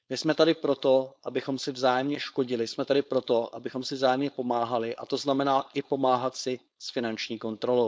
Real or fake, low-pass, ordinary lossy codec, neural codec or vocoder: fake; none; none; codec, 16 kHz, 4.8 kbps, FACodec